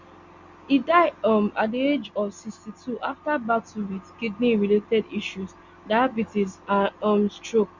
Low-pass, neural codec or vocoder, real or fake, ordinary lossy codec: 7.2 kHz; none; real; Opus, 64 kbps